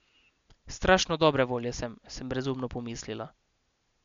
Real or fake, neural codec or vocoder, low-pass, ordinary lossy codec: real; none; 7.2 kHz; MP3, 64 kbps